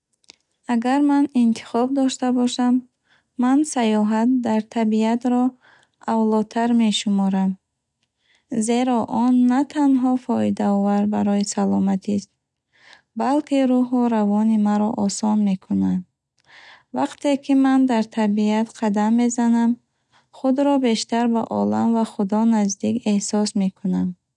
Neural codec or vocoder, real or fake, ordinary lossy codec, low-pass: none; real; none; 10.8 kHz